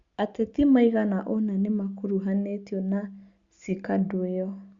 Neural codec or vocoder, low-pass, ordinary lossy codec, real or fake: none; 7.2 kHz; Opus, 64 kbps; real